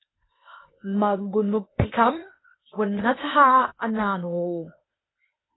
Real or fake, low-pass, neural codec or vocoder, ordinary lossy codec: fake; 7.2 kHz; codec, 16 kHz, 0.8 kbps, ZipCodec; AAC, 16 kbps